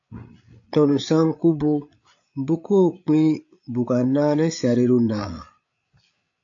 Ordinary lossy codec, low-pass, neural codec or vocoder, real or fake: AAC, 64 kbps; 7.2 kHz; codec, 16 kHz, 8 kbps, FreqCodec, larger model; fake